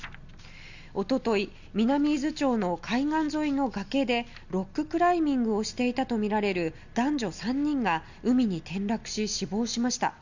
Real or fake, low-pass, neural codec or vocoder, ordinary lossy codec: real; 7.2 kHz; none; Opus, 64 kbps